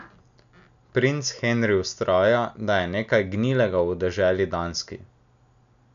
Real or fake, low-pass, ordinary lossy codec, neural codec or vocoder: real; 7.2 kHz; none; none